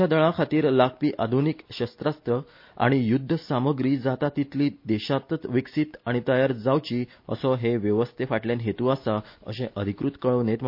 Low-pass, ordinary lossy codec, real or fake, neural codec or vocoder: 5.4 kHz; MP3, 32 kbps; real; none